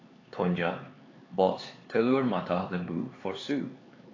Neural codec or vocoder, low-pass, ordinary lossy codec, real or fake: codec, 16 kHz, 4 kbps, X-Codec, HuBERT features, trained on LibriSpeech; 7.2 kHz; AAC, 32 kbps; fake